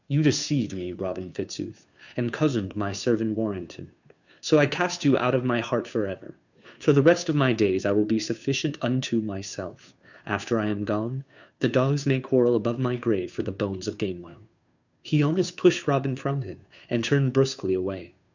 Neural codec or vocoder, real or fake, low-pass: codec, 16 kHz, 2 kbps, FunCodec, trained on Chinese and English, 25 frames a second; fake; 7.2 kHz